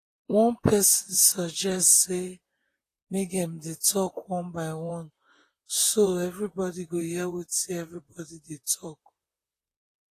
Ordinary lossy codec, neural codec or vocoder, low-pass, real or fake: AAC, 48 kbps; vocoder, 44.1 kHz, 128 mel bands, Pupu-Vocoder; 14.4 kHz; fake